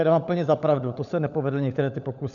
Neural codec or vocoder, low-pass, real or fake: codec, 16 kHz, 16 kbps, FunCodec, trained on LibriTTS, 50 frames a second; 7.2 kHz; fake